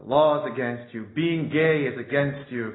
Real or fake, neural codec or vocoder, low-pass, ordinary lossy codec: real; none; 7.2 kHz; AAC, 16 kbps